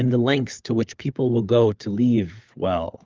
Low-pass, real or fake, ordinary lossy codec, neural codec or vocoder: 7.2 kHz; fake; Opus, 24 kbps; codec, 16 kHz, 4 kbps, FunCodec, trained on LibriTTS, 50 frames a second